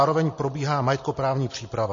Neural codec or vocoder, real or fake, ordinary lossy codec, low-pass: none; real; MP3, 32 kbps; 7.2 kHz